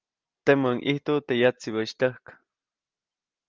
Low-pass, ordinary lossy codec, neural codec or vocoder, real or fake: 7.2 kHz; Opus, 32 kbps; none; real